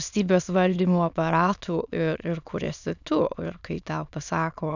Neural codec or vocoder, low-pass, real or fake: autoencoder, 22.05 kHz, a latent of 192 numbers a frame, VITS, trained on many speakers; 7.2 kHz; fake